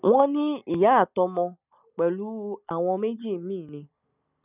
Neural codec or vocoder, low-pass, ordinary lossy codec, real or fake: none; 3.6 kHz; none; real